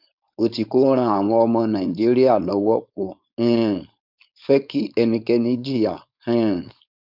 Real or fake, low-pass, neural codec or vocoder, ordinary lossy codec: fake; 5.4 kHz; codec, 16 kHz, 4.8 kbps, FACodec; none